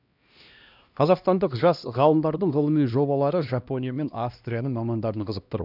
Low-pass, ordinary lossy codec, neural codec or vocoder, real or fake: 5.4 kHz; none; codec, 16 kHz, 1 kbps, X-Codec, HuBERT features, trained on LibriSpeech; fake